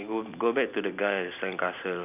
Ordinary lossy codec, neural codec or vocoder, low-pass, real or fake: none; none; 3.6 kHz; real